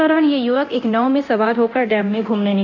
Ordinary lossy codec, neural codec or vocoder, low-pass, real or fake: none; codec, 24 kHz, 0.9 kbps, DualCodec; 7.2 kHz; fake